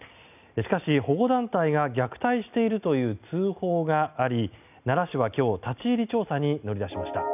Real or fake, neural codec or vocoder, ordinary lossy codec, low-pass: real; none; none; 3.6 kHz